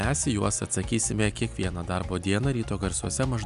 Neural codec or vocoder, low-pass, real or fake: none; 10.8 kHz; real